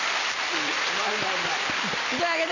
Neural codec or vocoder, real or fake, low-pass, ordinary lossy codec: none; real; 7.2 kHz; none